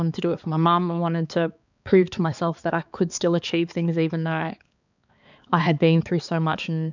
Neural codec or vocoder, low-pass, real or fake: codec, 16 kHz, 4 kbps, X-Codec, HuBERT features, trained on balanced general audio; 7.2 kHz; fake